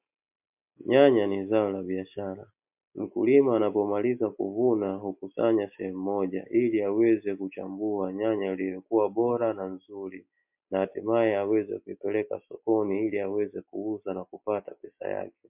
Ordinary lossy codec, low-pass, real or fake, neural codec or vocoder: MP3, 32 kbps; 3.6 kHz; real; none